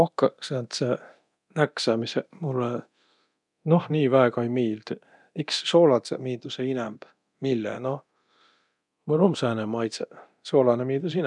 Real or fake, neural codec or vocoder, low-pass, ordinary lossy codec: fake; codec, 24 kHz, 0.9 kbps, DualCodec; 10.8 kHz; none